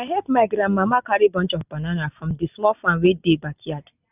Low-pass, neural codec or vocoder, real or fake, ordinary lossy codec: 3.6 kHz; none; real; none